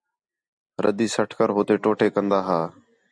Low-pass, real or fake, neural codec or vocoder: 9.9 kHz; real; none